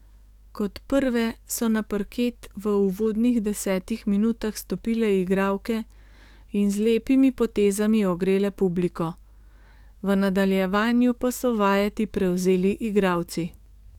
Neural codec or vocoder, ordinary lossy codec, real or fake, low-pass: codec, 44.1 kHz, 7.8 kbps, DAC; none; fake; 19.8 kHz